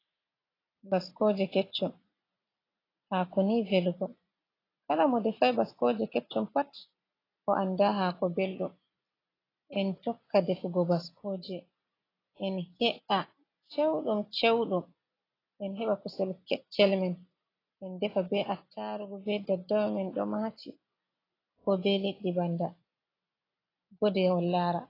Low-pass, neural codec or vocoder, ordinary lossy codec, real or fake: 5.4 kHz; none; AAC, 24 kbps; real